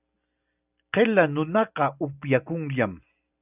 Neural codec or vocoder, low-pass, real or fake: none; 3.6 kHz; real